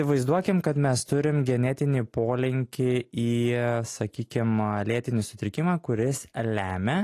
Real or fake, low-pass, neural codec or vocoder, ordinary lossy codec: real; 14.4 kHz; none; AAC, 48 kbps